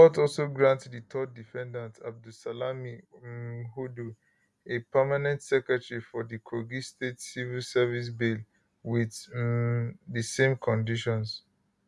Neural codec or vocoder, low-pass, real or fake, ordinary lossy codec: none; none; real; none